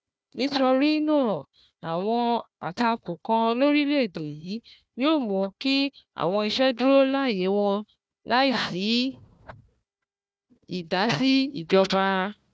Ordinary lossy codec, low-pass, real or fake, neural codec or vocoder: none; none; fake; codec, 16 kHz, 1 kbps, FunCodec, trained on Chinese and English, 50 frames a second